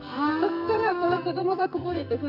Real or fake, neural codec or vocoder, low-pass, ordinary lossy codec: fake; codec, 44.1 kHz, 2.6 kbps, SNAC; 5.4 kHz; none